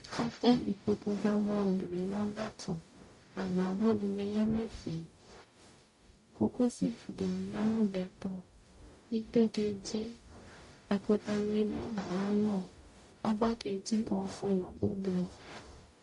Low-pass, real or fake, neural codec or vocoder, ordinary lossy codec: 14.4 kHz; fake; codec, 44.1 kHz, 0.9 kbps, DAC; MP3, 48 kbps